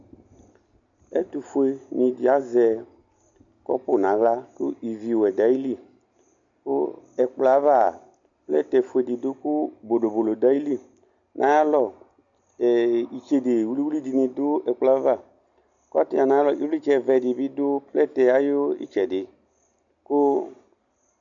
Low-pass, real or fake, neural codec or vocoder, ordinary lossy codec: 7.2 kHz; real; none; MP3, 64 kbps